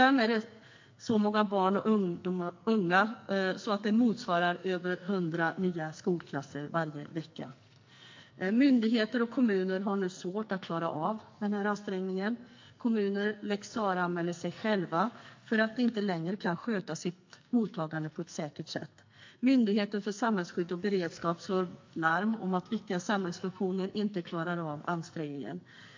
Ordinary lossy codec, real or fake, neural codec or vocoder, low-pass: MP3, 48 kbps; fake; codec, 44.1 kHz, 2.6 kbps, SNAC; 7.2 kHz